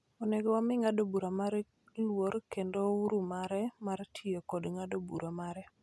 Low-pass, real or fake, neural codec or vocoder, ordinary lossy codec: none; real; none; none